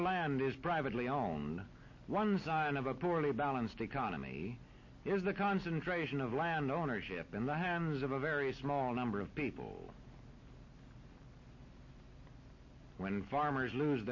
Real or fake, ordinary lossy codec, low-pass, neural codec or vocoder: real; MP3, 32 kbps; 7.2 kHz; none